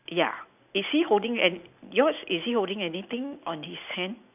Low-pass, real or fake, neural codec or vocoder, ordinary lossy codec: 3.6 kHz; real; none; none